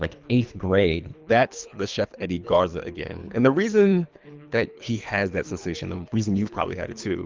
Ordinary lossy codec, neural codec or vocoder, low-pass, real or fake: Opus, 24 kbps; codec, 24 kHz, 3 kbps, HILCodec; 7.2 kHz; fake